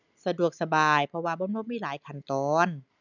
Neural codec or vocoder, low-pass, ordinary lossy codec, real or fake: none; 7.2 kHz; none; real